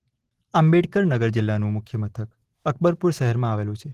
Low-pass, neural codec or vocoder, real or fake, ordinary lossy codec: 14.4 kHz; none; real; Opus, 16 kbps